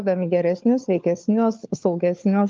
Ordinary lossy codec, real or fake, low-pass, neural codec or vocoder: Opus, 24 kbps; fake; 7.2 kHz; codec, 16 kHz, 4 kbps, FunCodec, trained on LibriTTS, 50 frames a second